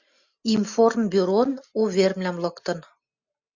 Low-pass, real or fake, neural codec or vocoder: 7.2 kHz; real; none